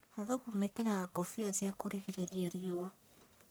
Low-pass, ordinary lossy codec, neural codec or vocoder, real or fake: none; none; codec, 44.1 kHz, 1.7 kbps, Pupu-Codec; fake